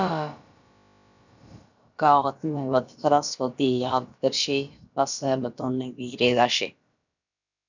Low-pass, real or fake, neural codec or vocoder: 7.2 kHz; fake; codec, 16 kHz, about 1 kbps, DyCAST, with the encoder's durations